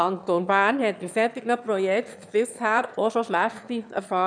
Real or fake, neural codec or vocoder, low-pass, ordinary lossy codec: fake; autoencoder, 22.05 kHz, a latent of 192 numbers a frame, VITS, trained on one speaker; 9.9 kHz; none